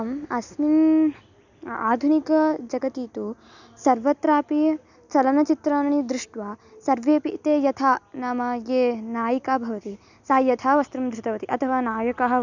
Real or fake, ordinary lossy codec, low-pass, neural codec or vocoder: real; none; 7.2 kHz; none